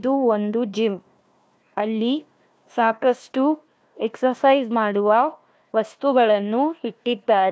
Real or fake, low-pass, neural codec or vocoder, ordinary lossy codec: fake; none; codec, 16 kHz, 1 kbps, FunCodec, trained on Chinese and English, 50 frames a second; none